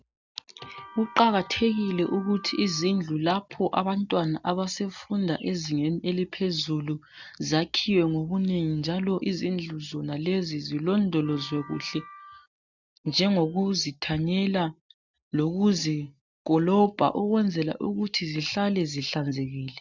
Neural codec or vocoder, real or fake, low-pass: none; real; 7.2 kHz